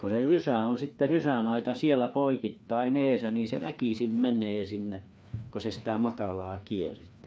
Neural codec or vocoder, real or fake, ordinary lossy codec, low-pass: codec, 16 kHz, 2 kbps, FreqCodec, larger model; fake; none; none